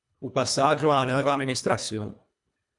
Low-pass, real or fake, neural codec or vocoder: 10.8 kHz; fake; codec, 24 kHz, 1.5 kbps, HILCodec